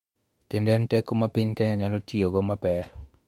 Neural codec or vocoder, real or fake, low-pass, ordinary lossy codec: autoencoder, 48 kHz, 32 numbers a frame, DAC-VAE, trained on Japanese speech; fake; 19.8 kHz; MP3, 64 kbps